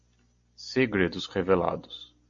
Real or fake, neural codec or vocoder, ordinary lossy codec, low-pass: real; none; MP3, 48 kbps; 7.2 kHz